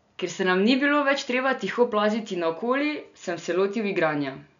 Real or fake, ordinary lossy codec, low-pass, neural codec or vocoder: real; none; 7.2 kHz; none